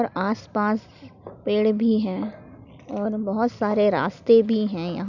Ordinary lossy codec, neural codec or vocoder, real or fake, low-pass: none; none; real; 7.2 kHz